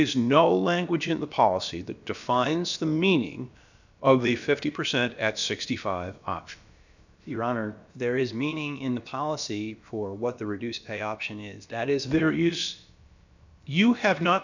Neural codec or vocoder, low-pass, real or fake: codec, 16 kHz, about 1 kbps, DyCAST, with the encoder's durations; 7.2 kHz; fake